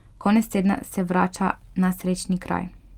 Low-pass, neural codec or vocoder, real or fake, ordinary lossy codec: 19.8 kHz; none; real; Opus, 32 kbps